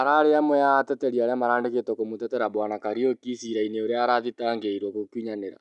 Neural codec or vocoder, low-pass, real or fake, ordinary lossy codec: none; 10.8 kHz; real; none